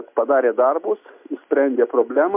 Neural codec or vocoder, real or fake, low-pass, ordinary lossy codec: none; real; 3.6 kHz; MP3, 32 kbps